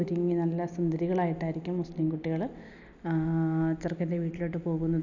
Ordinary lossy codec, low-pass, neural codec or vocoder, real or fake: none; 7.2 kHz; none; real